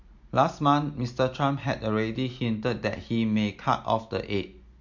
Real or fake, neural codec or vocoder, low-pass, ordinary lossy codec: real; none; 7.2 kHz; MP3, 48 kbps